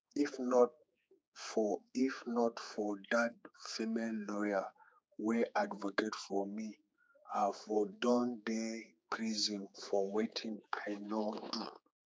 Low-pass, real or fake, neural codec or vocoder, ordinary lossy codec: none; fake; codec, 16 kHz, 4 kbps, X-Codec, HuBERT features, trained on general audio; none